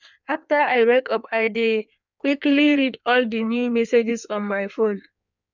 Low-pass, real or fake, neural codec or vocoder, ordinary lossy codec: 7.2 kHz; fake; codec, 16 kHz in and 24 kHz out, 1.1 kbps, FireRedTTS-2 codec; none